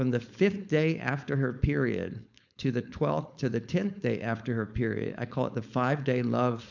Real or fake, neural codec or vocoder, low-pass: fake; codec, 16 kHz, 4.8 kbps, FACodec; 7.2 kHz